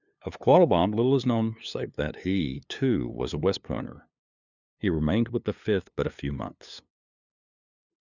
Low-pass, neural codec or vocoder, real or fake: 7.2 kHz; codec, 16 kHz, 2 kbps, FunCodec, trained on LibriTTS, 25 frames a second; fake